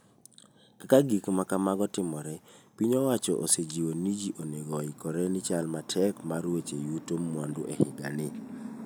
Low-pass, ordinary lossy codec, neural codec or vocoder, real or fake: none; none; none; real